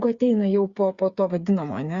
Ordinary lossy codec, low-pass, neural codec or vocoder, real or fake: Opus, 64 kbps; 7.2 kHz; codec, 16 kHz, 8 kbps, FreqCodec, smaller model; fake